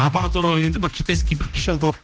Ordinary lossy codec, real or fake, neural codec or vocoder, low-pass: none; fake; codec, 16 kHz, 1 kbps, X-Codec, HuBERT features, trained on general audio; none